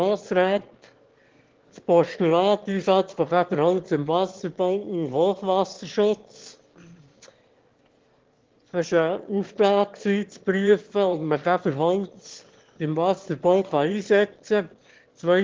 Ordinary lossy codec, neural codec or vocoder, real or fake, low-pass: Opus, 16 kbps; autoencoder, 22.05 kHz, a latent of 192 numbers a frame, VITS, trained on one speaker; fake; 7.2 kHz